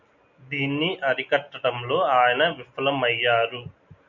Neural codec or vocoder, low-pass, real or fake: none; 7.2 kHz; real